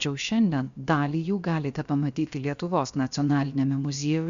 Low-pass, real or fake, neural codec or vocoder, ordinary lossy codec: 7.2 kHz; fake; codec, 16 kHz, about 1 kbps, DyCAST, with the encoder's durations; Opus, 64 kbps